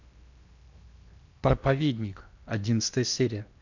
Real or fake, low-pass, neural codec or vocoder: fake; 7.2 kHz; codec, 16 kHz in and 24 kHz out, 0.8 kbps, FocalCodec, streaming, 65536 codes